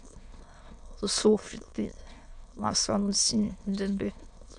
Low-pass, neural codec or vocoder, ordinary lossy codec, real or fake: 9.9 kHz; autoencoder, 22.05 kHz, a latent of 192 numbers a frame, VITS, trained on many speakers; AAC, 64 kbps; fake